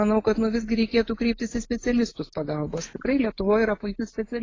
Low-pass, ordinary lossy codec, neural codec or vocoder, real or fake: 7.2 kHz; AAC, 32 kbps; none; real